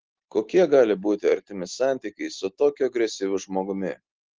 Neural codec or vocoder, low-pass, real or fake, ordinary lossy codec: none; 7.2 kHz; real; Opus, 16 kbps